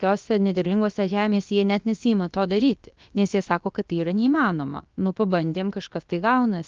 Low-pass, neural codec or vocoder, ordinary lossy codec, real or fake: 7.2 kHz; codec, 16 kHz, about 1 kbps, DyCAST, with the encoder's durations; Opus, 24 kbps; fake